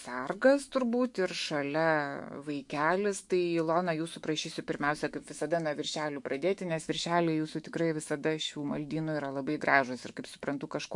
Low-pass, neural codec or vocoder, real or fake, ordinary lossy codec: 10.8 kHz; autoencoder, 48 kHz, 128 numbers a frame, DAC-VAE, trained on Japanese speech; fake; MP3, 64 kbps